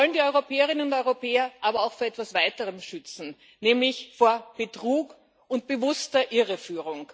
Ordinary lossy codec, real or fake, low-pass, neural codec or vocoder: none; real; none; none